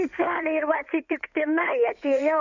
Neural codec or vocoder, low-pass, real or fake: codec, 16 kHz in and 24 kHz out, 2.2 kbps, FireRedTTS-2 codec; 7.2 kHz; fake